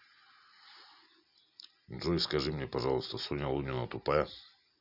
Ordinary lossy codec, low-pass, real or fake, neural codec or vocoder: none; 5.4 kHz; real; none